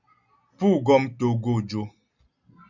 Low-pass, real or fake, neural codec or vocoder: 7.2 kHz; real; none